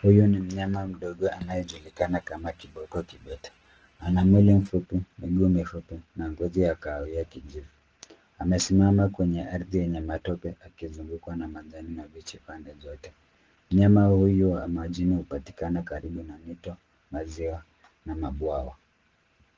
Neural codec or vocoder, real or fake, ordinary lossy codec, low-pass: none; real; Opus, 16 kbps; 7.2 kHz